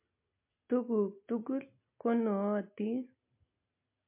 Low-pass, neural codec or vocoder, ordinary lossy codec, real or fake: 3.6 kHz; none; MP3, 24 kbps; real